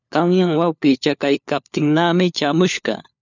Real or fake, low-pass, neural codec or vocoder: fake; 7.2 kHz; codec, 16 kHz, 4 kbps, FunCodec, trained on LibriTTS, 50 frames a second